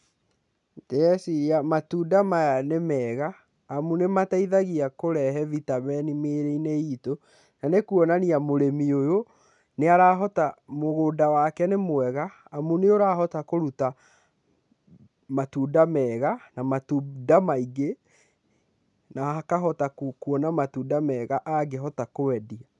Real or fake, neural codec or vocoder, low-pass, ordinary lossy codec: real; none; 10.8 kHz; none